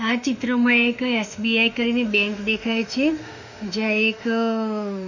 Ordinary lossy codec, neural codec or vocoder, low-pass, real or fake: none; autoencoder, 48 kHz, 32 numbers a frame, DAC-VAE, trained on Japanese speech; 7.2 kHz; fake